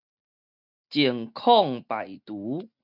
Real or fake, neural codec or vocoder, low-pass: real; none; 5.4 kHz